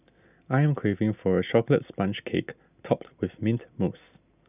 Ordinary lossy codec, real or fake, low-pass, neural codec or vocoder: none; real; 3.6 kHz; none